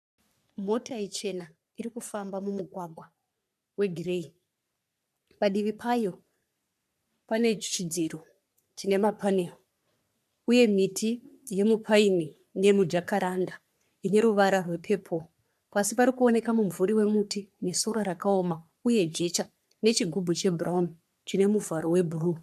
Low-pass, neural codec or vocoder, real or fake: 14.4 kHz; codec, 44.1 kHz, 3.4 kbps, Pupu-Codec; fake